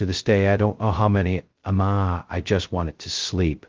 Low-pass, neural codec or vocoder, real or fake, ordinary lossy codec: 7.2 kHz; codec, 16 kHz, 0.2 kbps, FocalCodec; fake; Opus, 16 kbps